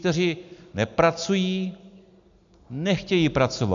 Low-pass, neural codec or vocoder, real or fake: 7.2 kHz; none; real